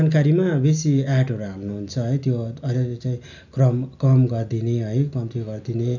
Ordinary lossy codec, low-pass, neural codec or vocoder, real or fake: none; 7.2 kHz; none; real